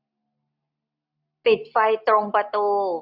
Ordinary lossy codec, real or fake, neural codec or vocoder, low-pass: AAC, 48 kbps; real; none; 5.4 kHz